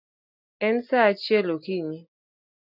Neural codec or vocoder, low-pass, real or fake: none; 5.4 kHz; real